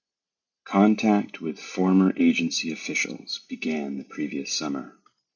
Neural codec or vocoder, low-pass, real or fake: none; 7.2 kHz; real